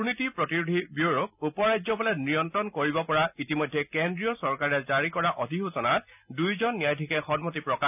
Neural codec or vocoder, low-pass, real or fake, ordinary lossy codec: none; 3.6 kHz; real; none